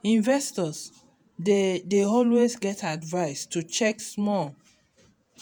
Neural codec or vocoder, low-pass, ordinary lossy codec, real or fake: vocoder, 48 kHz, 128 mel bands, Vocos; none; none; fake